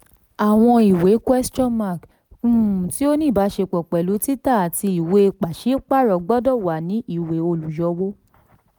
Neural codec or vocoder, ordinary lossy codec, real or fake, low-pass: none; none; real; none